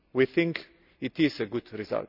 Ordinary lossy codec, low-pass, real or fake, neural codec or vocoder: none; 5.4 kHz; real; none